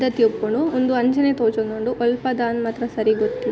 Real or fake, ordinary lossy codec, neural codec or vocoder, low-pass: real; none; none; none